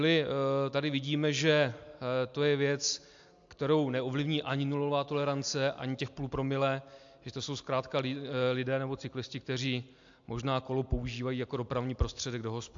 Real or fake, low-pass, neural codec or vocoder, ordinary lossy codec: real; 7.2 kHz; none; AAC, 64 kbps